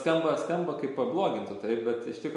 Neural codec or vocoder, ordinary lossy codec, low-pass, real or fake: none; MP3, 48 kbps; 14.4 kHz; real